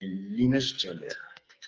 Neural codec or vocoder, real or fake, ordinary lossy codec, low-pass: codec, 44.1 kHz, 2.6 kbps, SNAC; fake; Opus, 24 kbps; 7.2 kHz